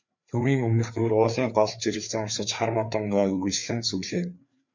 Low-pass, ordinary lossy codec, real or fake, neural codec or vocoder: 7.2 kHz; MP3, 64 kbps; fake; codec, 16 kHz, 4 kbps, FreqCodec, larger model